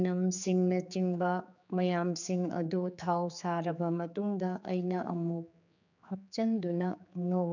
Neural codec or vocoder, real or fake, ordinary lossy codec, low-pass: codec, 16 kHz, 4 kbps, X-Codec, HuBERT features, trained on general audio; fake; none; 7.2 kHz